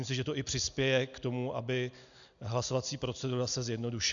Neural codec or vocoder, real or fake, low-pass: none; real; 7.2 kHz